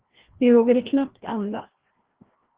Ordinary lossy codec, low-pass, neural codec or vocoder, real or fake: Opus, 16 kbps; 3.6 kHz; codec, 16 kHz, 1 kbps, FreqCodec, larger model; fake